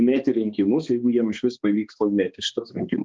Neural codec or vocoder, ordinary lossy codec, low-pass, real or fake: codec, 16 kHz, 2 kbps, X-Codec, HuBERT features, trained on balanced general audio; Opus, 32 kbps; 7.2 kHz; fake